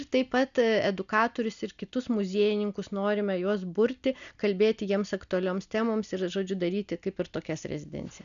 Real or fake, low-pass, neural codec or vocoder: real; 7.2 kHz; none